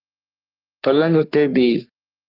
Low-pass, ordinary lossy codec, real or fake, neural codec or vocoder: 5.4 kHz; Opus, 24 kbps; fake; codec, 44.1 kHz, 3.4 kbps, Pupu-Codec